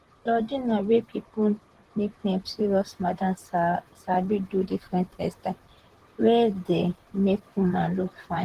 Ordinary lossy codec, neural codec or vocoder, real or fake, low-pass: Opus, 16 kbps; vocoder, 44.1 kHz, 128 mel bands, Pupu-Vocoder; fake; 14.4 kHz